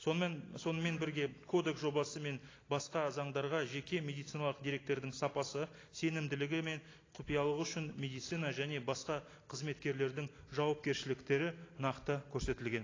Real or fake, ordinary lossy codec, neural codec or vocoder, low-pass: real; AAC, 32 kbps; none; 7.2 kHz